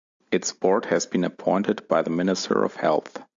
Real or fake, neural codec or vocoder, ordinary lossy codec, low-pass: real; none; AAC, 64 kbps; 7.2 kHz